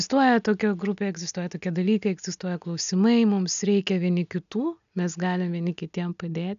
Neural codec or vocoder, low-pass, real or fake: none; 7.2 kHz; real